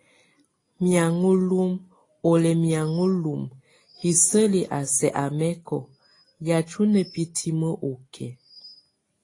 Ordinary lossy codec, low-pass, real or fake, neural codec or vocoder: AAC, 32 kbps; 10.8 kHz; real; none